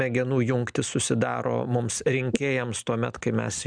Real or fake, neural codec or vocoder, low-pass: real; none; 9.9 kHz